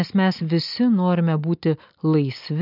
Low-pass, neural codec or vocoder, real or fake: 5.4 kHz; none; real